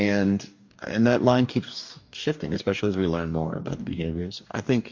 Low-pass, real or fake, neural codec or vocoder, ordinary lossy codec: 7.2 kHz; fake; codec, 44.1 kHz, 2.6 kbps, DAC; MP3, 48 kbps